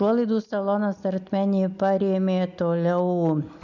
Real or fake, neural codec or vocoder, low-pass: real; none; 7.2 kHz